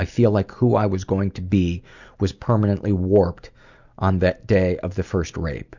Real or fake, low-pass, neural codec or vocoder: real; 7.2 kHz; none